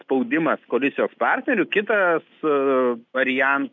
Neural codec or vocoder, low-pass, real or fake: none; 7.2 kHz; real